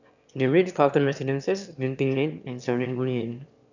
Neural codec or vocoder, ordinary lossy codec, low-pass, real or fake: autoencoder, 22.05 kHz, a latent of 192 numbers a frame, VITS, trained on one speaker; none; 7.2 kHz; fake